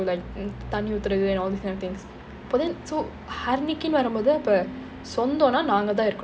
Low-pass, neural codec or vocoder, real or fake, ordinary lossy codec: none; none; real; none